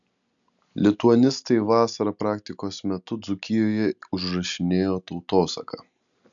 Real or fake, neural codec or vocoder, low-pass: real; none; 7.2 kHz